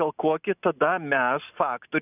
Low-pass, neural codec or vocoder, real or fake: 3.6 kHz; none; real